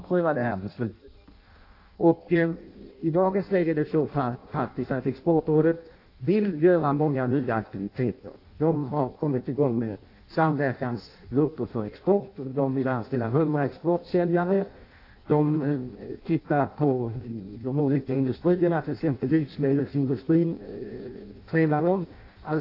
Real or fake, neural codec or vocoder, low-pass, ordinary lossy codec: fake; codec, 16 kHz in and 24 kHz out, 0.6 kbps, FireRedTTS-2 codec; 5.4 kHz; AAC, 32 kbps